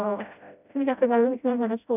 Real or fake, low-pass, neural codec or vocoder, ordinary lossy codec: fake; 3.6 kHz; codec, 16 kHz, 0.5 kbps, FreqCodec, smaller model; none